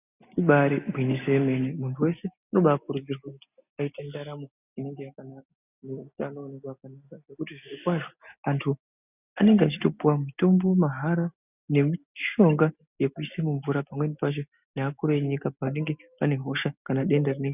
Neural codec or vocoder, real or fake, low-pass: none; real; 3.6 kHz